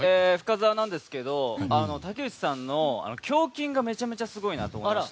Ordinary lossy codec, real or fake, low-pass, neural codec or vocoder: none; real; none; none